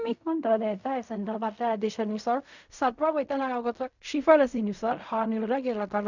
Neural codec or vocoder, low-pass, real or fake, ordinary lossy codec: codec, 16 kHz in and 24 kHz out, 0.4 kbps, LongCat-Audio-Codec, fine tuned four codebook decoder; 7.2 kHz; fake; none